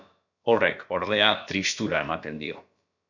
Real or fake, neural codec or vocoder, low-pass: fake; codec, 16 kHz, about 1 kbps, DyCAST, with the encoder's durations; 7.2 kHz